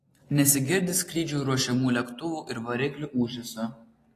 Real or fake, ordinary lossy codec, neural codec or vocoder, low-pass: real; AAC, 48 kbps; none; 14.4 kHz